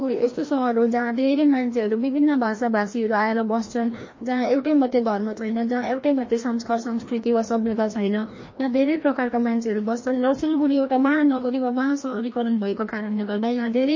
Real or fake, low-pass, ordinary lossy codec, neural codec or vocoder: fake; 7.2 kHz; MP3, 32 kbps; codec, 16 kHz, 1 kbps, FreqCodec, larger model